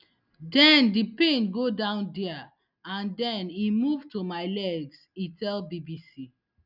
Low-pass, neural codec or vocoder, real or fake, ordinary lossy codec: 5.4 kHz; none; real; none